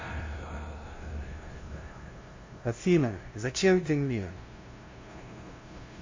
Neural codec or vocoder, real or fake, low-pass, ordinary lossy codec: codec, 16 kHz, 0.5 kbps, FunCodec, trained on LibriTTS, 25 frames a second; fake; 7.2 kHz; MP3, 32 kbps